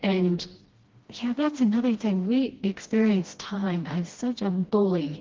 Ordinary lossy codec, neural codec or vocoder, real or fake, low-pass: Opus, 16 kbps; codec, 16 kHz, 1 kbps, FreqCodec, smaller model; fake; 7.2 kHz